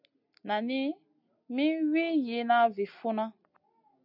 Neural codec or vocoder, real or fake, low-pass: none; real; 5.4 kHz